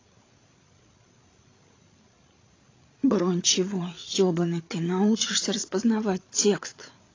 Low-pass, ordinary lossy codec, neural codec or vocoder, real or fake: 7.2 kHz; AAC, 32 kbps; codec, 16 kHz, 8 kbps, FreqCodec, larger model; fake